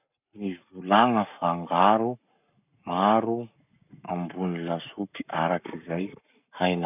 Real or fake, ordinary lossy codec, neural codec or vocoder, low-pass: fake; none; codec, 44.1 kHz, 7.8 kbps, Pupu-Codec; 3.6 kHz